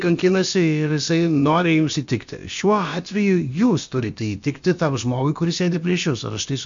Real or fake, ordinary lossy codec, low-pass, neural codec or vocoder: fake; MP3, 64 kbps; 7.2 kHz; codec, 16 kHz, about 1 kbps, DyCAST, with the encoder's durations